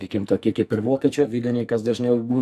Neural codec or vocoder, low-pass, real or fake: codec, 44.1 kHz, 2.6 kbps, SNAC; 14.4 kHz; fake